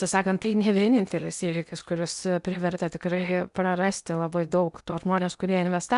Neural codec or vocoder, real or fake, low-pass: codec, 16 kHz in and 24 kHz out, 0.8 kbps, FocalCodec, streaming, 65536 codes; fake; 10.8 kHz